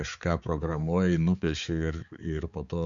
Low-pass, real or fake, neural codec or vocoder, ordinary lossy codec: 7.2 kHz; fake; codec, 16 kHz, 4 kbps, X-Codec, HuBERT features, trained on balanced general audio; Opus, 64 kbps